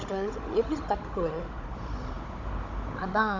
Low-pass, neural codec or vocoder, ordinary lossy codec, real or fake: 7.2 kHz; codec, 16 kHz, 16 kbps, FunCodec, trained on Chinese and English, 50 frames a second; none; fake